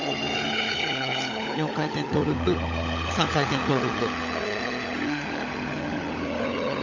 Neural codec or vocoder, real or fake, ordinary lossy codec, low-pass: codec, 16 kHz, 16 kbps, FunCodec, trained on LibriTTS, 50 frames a second; fake; Opus, 64 kbps; 7.2 kHz